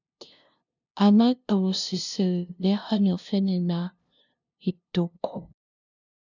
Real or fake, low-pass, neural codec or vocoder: fake; 7.2 kHz; codec, 16 kHz, 0.5 kbps, FunCodec, trained on LibriTTS, 25 frames a second